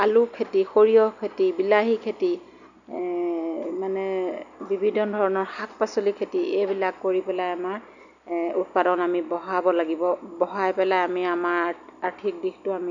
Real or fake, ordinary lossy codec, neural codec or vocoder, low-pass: real; none; none; 7.2 kHz